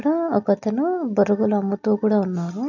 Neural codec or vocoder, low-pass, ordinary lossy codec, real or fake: none; 7.2 kHz; none; real